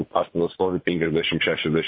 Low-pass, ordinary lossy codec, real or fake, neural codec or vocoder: 5.4 kHz; MP3, 24 kbps; fake; vocoder, 44.1 kHz, 80 mel bands, Vocos